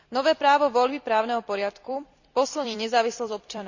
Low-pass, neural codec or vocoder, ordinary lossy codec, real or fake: 7.2 kHz; vocoder, 44.1 kHz, 128 mel bands every 256 samples, BigVGAN v2; none; fake